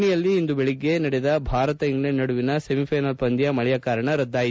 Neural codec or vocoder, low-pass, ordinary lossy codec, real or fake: none; none; none; real